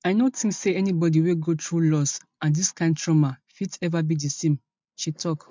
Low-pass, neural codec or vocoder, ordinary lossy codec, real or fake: 7.2 kHz; none; MP3, 64 kbps; real